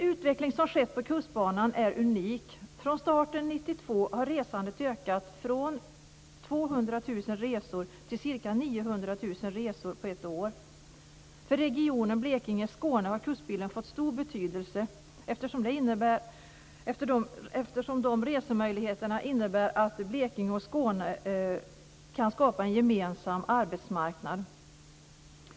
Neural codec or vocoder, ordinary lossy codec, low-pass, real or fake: none; none; none; real